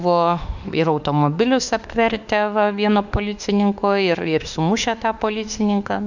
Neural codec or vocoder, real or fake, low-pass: autoencoder, 48 kHz, 32 numbers a frame, DAC-VAE, trained on Japanese speech; fake; 7.2 kHz